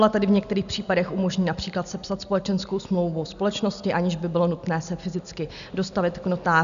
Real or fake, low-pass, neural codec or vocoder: real; 7.2 kHz; none